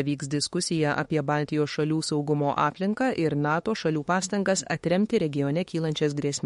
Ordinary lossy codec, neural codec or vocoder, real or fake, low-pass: MP3, 48 kbps; autoencoder, 48 kHz, 32 numbers a frame, DAC-VAE, trained on Japanese speech; fake; 19.8 kHz